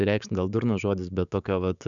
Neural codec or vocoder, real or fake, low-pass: codec, 16 kHz, 6 kbps, DAC; fake; 7.2 kHz